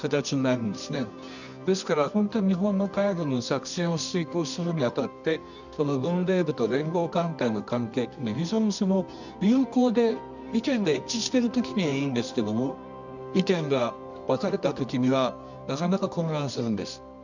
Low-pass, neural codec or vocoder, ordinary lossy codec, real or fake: 7.2 kHz; codec, 24 kHz, 0.9 kbps, WavTokenizer, medium music audio release; none; fake